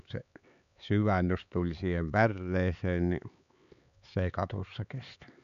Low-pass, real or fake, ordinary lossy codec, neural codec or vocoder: 7.2 kHz; fake; none; codec, 16 kHz, 4 kbps, X-Codec, HuBERT features, trained on LibriSpeech